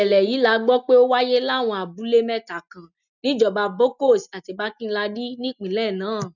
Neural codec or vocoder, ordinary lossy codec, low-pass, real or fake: none; none; 7.2 kHz; real